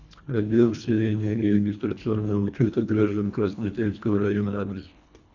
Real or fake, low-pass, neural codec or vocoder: fake; 7.2 kHz; codec, 24 kHz, 1.5 kbps, HILCodec